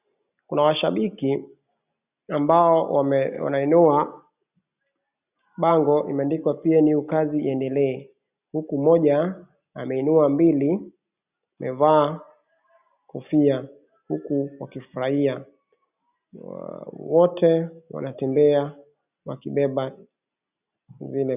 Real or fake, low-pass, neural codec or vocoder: real; 3.6 kHz; none